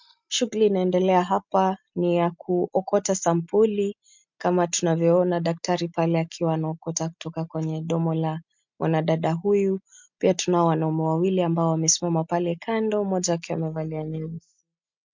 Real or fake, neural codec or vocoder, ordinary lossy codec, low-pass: real; none; MP3, 64 kbps; 7.2 kHz